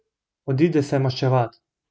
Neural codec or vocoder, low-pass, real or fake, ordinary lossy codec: none; none; real; none